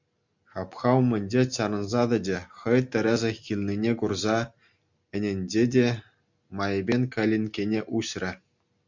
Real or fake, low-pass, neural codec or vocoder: real; 7.2 kHz; none